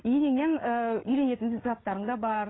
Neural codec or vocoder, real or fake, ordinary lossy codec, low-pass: codec, 16 kHz, 8 kbps, FreqCodec, larger model; fake; AAC, 16 kbps; 7.2 kHz